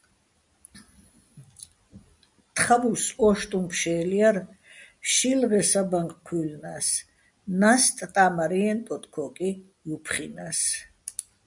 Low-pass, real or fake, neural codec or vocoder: 10.8 kHz; real; none